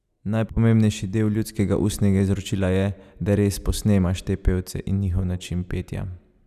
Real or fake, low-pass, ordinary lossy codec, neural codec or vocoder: real; 14.4 kHz; none; none